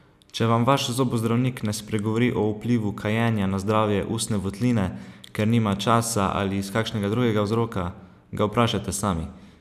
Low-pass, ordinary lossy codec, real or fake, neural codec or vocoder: 14.4 kHz; none; real; none